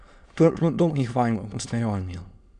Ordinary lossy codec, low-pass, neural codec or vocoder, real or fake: none; 9.9 kHz; autoencoder, 22.05 kHz, a latent of 192 numbers a frame, VITS, trained on many speakers; fake